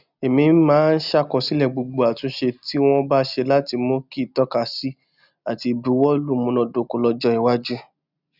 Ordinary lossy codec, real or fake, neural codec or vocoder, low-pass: none; real; none; 5.4 kHz